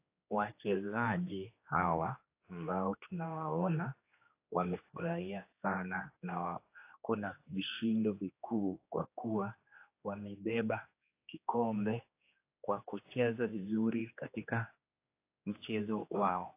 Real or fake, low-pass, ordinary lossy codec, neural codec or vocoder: fake; 3.6 kHz; AAC, 24 kbps; codec, 16 kHz, 2 kbps, X-Codec, HuBERT features, trained on general audio